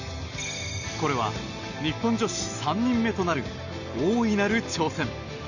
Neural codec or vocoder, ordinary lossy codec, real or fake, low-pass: none; none; real; 7.2 kHz